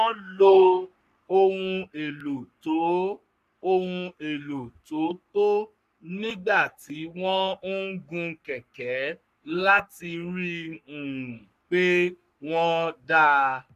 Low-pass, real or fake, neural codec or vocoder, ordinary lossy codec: 14.4 kHz; fake; codec, 44.1 kHz, 3.4 kbps, Pupu-Codec; none